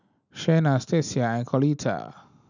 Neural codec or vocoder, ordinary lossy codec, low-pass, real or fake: none; none; 7.2 kHz; real